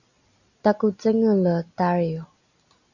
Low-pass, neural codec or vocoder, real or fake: 7.2 kHz; none; real